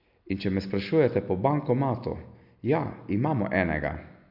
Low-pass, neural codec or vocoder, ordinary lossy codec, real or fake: 5.4 kHz; none; AAC, 48 kbps; real